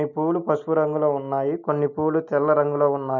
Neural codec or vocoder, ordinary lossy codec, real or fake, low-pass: none; none; real; 7.2 kHz